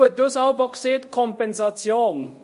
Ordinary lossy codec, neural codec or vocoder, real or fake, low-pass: MP3, 48 kbps; codec, 24 kHz, 0.5 kbps, DualCodec; fake; 10.8 kHz